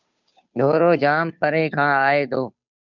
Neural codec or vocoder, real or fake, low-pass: codec, 16 kHz, 2 kbps, FunCodec, trained on Chinese and English, 25 frames a second; fake; 7.2 kHz